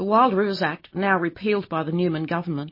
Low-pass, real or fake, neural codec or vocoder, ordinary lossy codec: 5.4 kHz; real; none; MP3, 24 kbps